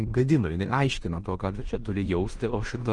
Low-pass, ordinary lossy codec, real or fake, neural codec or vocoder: 10.8 kHz; Opus, 24 kbps; fake; codec, 16 kHz in and 24 kHz out, 0.9 kbps, LongCat-Audio-Codec, fine tuned four codebook decoder